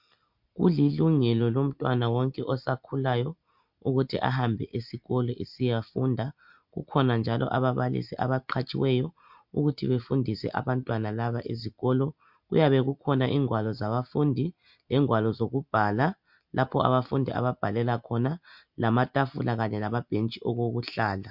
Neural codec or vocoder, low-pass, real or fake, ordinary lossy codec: none; 5.4 kHz; real; MP3, 48 kbps